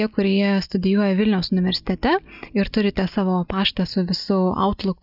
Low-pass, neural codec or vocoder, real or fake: 5.4 kHz; none; real